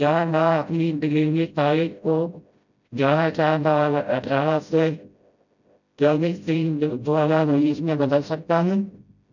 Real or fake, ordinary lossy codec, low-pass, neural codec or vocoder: fake; none; 7.2 kHz; codec, 16 kHz, 0.5 kbps, FreqCodec, smaller model